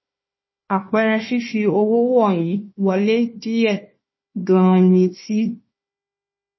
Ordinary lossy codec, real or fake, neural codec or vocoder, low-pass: MP3, 24 kbps; fake; codec, 16 kHz, 1 kbps, FunCodec, trained on Chinese and English, 50 frames a second; 7.2 kHz